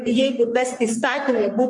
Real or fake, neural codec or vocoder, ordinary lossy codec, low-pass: fake; codec, 44.1 kHz, 3.4 kbps, Pupu-Codec; MP3, 64 kbps; 10.8 kHz